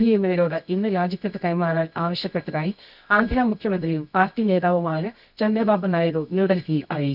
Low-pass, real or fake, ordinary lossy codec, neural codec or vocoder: 5.4 kHz; fake; none; codec, 24 kHz, 0.9 kbps, WavTokenizer, medium music audio release